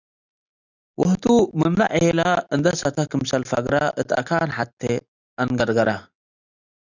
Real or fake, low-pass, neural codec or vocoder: real; 7.2 kHz; none